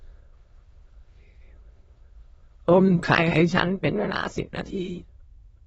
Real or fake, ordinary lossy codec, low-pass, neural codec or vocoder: fake; AAC, 24 kbps; 9.9 kHz; autoencoder, 22.05 kHz, a latent of 192 numbers a frame, VITS, trained on many speakers